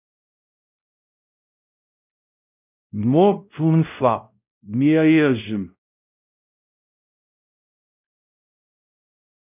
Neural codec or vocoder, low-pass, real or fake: codec, 16 kHz, 0.5 kbps, X-Codec, WavLM features, trained on Multilingual LibriSpeech; 3.6 kHz; fake